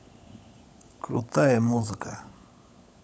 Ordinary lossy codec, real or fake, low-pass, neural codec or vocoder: none; fake; none; codec, 16 kHz, 16 kbps, FunCodec, trained on LibriTTS, 50 frames a second